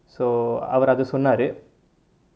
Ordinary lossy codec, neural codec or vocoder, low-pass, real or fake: none; none; none; real